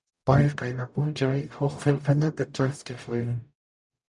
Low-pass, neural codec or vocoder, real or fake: 10.8 kHz; codec, 44.1 kHz, 0.9 kbps, DAC; fake